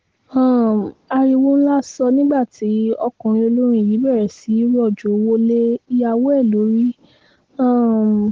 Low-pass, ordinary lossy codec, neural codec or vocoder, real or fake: 7.2 kHz; Opus, 16 kbps; none; real